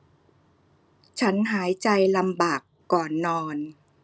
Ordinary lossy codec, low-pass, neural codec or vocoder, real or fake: none; none; none; real